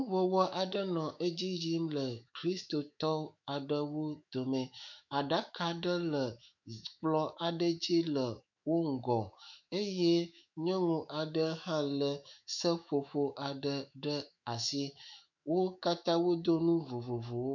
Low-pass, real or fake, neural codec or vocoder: 7.2 kHz; fake; autoencoder, 48 kHz, 128 numbers a frame, DAC-VAE, trained on Japanese speech